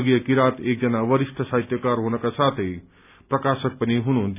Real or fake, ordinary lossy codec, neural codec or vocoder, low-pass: real; none; none; 3.6 kHz